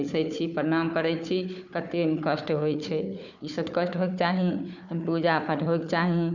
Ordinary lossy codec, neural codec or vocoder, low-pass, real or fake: none; codec, 16 kHz, 4 kbps, FunCodec, trained on Chinese and English, 50 frames a second; 7.2 kHz; fake